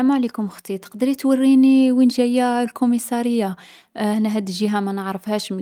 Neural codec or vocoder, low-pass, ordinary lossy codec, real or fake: none; 19.8 kHz; Opus, 32 kbps; real